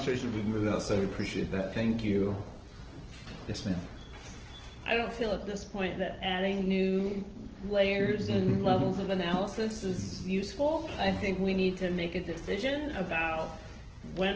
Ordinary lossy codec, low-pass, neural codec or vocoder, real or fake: Opus, 16 kbps; 7.2 kHz; none; real